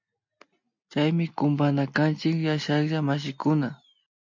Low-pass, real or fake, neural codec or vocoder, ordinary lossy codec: 7.2 kHz; real; none; MP3, 48 kbps